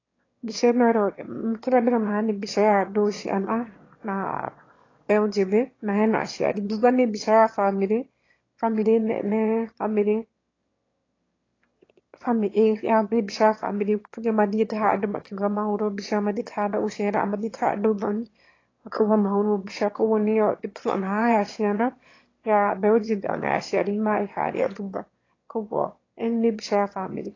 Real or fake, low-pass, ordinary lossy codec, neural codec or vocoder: fake; 7.2 kHz; AAC, 32 kbps; autoencoder, 22.05 kHz, a latent of 192 numbers a frame, VITS, trained on one speaker